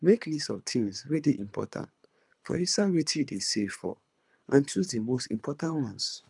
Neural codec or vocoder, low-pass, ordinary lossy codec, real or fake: codec, 24 kHz, 3 kbps, HILCodec; 10.8 kHz; none; fake